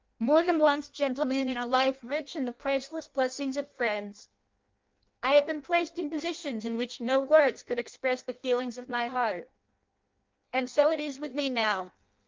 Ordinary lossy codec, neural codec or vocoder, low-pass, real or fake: Opus, 32 kbps; codec, 16 kHz in and 24 kHz out, 0.6 kbps, FireRedTTS-2 codec; 7.2 kHz; fake